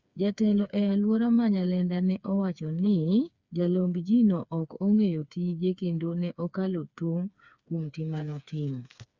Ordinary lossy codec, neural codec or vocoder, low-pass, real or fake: Opus, 64 kbps; codec, 16 kHz, 4 kbps, FreqCodec, smaller model; 7.2 kHz; fake